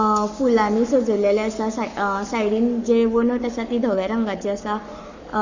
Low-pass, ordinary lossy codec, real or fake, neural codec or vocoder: 7.2 kHz; Opus, 64 kbps; fake; codec, 44.1 kHz, 7.8 kbps, Pupu-Codec